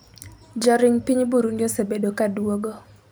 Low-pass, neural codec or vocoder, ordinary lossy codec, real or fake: none; none; none; real